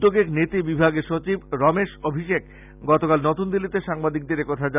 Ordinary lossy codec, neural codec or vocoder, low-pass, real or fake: none; none; 3.6 kHz; real